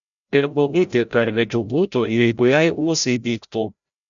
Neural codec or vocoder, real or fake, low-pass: codec, 16 kHz, 0.5 kbps, FreqCodec, larger model; fake; 7.2 kHz